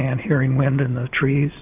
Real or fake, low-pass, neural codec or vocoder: real; 3.6 kHz; none